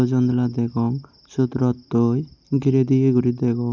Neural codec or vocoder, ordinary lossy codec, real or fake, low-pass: none; none; real; 7.2 kHz